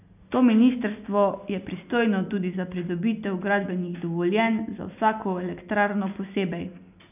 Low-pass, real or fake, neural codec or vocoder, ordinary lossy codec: 3.6 kHz; real; none; none